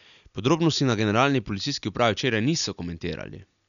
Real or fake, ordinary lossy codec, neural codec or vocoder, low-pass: real; none; none; 7.2 kHz